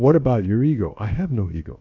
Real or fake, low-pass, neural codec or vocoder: fake; 7.2 kHz; codec, 16 kHz, about 1 kbps, DyCAST, with the encoder's durations